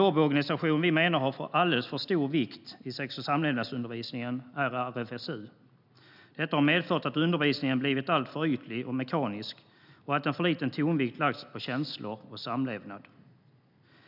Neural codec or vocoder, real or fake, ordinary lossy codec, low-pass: none; real; none; 5.4 kHz